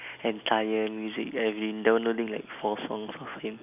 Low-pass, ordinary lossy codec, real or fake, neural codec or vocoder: 3.6 kHz; none; real; none